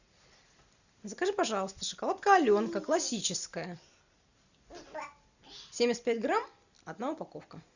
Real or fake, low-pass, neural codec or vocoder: real; 7.2 kHz; none